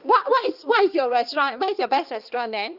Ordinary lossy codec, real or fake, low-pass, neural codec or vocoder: Opus, 32 kbps; fake; 5.4 kHz; codec, 16 kHz, 2 kbps, X-Codec, HuBERT features, trained on balanced general audio